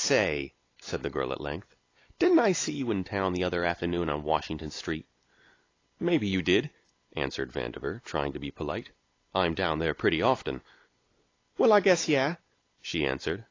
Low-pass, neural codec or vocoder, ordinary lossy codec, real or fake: 7.2 kHz; none; AAC, 32 kbps; real